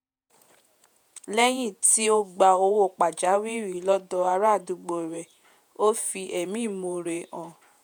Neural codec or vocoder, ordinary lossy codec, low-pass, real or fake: vocoder, 48 kHz, 128 mel bands, Vocos; none; none; fake